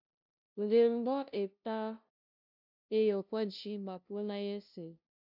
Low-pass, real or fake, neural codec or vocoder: 5.4 kHz; fake; codec, 16 kHz, 0.5 kbps, FunCodec, trained on LibriTTS, 25 frames a second